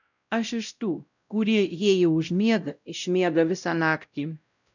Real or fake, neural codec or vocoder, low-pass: fake; codec, 16 kHz, 0.5 kbps, X-Codec, WavLM features, trained on Multilingual LibriSpeech; 7.2 kHz